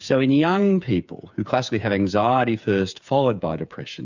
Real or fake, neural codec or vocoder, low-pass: fake; codec, 16 kHz, 8 kbps, FreqCodec, smaller model; 7.2 kHz